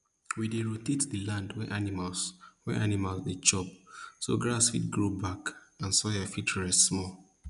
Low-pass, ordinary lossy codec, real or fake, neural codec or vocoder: 10.8 kHz; none; real; none